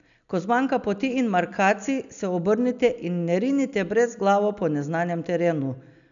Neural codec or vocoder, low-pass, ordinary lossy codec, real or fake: none; 7.2 kHz; none; real